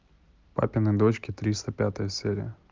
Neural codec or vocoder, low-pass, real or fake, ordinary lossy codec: none; 7.2 kHz; real; Opus, 32 kbps